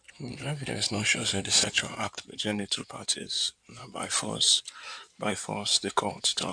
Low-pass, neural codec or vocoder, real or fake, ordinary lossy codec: 9.9 kHz; codec, 16 kHz in and 24 kHz out, 2.2 kbps, FireRedTTS-2 codec; fake; none